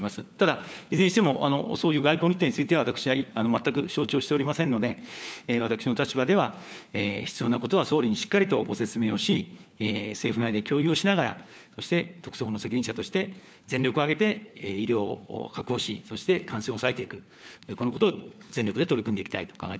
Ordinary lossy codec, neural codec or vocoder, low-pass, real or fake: none; codec, 16 kHz, 4 kbps, FunCodec, trained on LibriTTS, 50 frames a second; none; fake